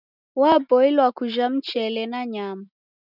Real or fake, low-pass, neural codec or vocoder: real; 5.4 kHz; none